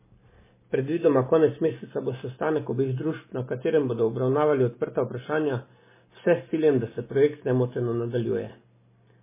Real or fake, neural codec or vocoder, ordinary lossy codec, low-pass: real; none; MP3, 16 kbps; 3.6 kHz